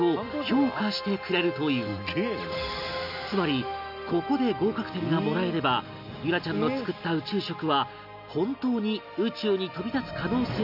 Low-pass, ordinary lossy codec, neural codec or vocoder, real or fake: 5.4 kHz; none; none; real